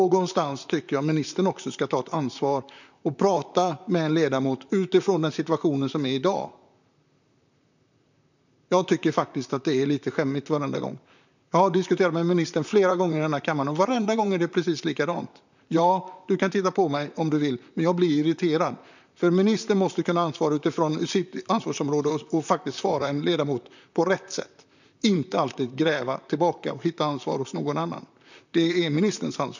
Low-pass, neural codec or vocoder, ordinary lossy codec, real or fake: 7.2 kHz; vocoder, 44.1 kHz, 128 mel bands, Pupu-Vocoder; none; fake